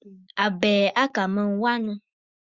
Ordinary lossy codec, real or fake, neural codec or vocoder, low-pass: Opus, 64 kbps; fake; autoencoder, 48 kHz, 128 numbers a frame, DAC-VAE, trained on Japanese speech; 7.2 kHz